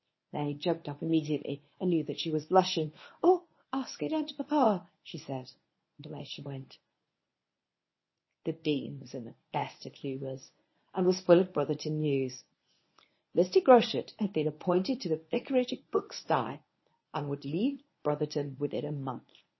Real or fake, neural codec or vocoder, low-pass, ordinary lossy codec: fake; codec, 24 kHz, 0.9 kbps, WavTokenizer, medium speech release version 1; 7.2 kHz; MP3, 24 kbps